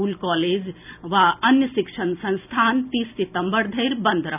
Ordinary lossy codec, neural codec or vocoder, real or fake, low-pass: none; none; real; 3.6 kHz